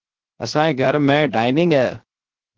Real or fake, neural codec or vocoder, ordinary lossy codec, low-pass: fake; codec, 16 kHz, 0.7 kbps, FocalCodec; Opus, 16 kbps; 7.2 kHz